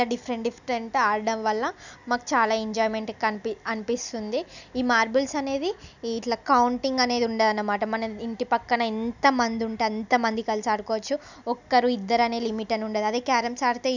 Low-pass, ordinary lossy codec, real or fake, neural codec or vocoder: 7.2 kHz; none; real; none